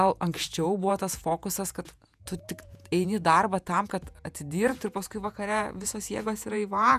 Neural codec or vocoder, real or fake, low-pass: vocoder, 48 kHz, 128 mel bands, Vocos; fake; 14.4 kHz